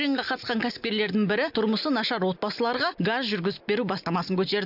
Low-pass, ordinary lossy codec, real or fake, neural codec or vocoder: 5.4 kHz; none; real; none